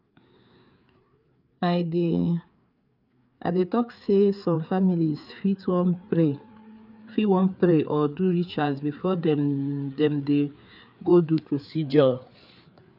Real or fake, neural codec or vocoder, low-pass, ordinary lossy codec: fake; codec, 16 kHz, 4 kbps, FreqCodec, larger model; 5.4 kHz; none